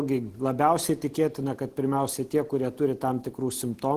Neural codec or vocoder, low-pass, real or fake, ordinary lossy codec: none; 14.4 kHz; real; Opus, 16 kbps